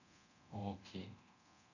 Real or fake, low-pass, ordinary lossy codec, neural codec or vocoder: fake; 7.2 kHz; none; codec, 24 kHz, 0.9 kbps, DualCodec